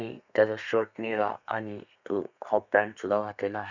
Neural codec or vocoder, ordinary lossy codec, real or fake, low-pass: codec, 32 kHz, 1.9 kbps, SNAC; none; fake; 7.2 kHz